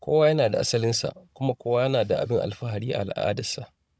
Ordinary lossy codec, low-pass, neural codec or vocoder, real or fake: none; none; codec, 16 kHz, 16 kbps, FunCodec, trained on Chinese and English, 50 frames a second; fake